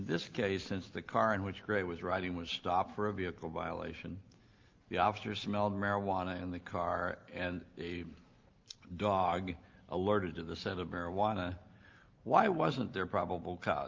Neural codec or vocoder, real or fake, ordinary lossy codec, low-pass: none; real; Opus, 32 kbps; 7.2 kHz